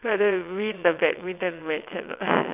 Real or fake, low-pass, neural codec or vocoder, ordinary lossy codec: fake; 3.6 kHz; vocoder, 22.05 kHz, 80 mel bands, WaveNeXt; none